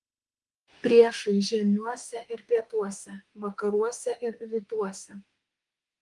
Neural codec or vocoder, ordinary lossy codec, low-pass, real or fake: autoencoder, 48 kHz, 32 numbers a frame, DAC-VAE, trained on Japanese speech; Opus, 24 kbps; 10.8 kHz; fake